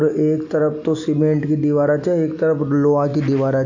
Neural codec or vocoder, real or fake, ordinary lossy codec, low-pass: none; real; AAC, 48 kbps; 7.2 kHz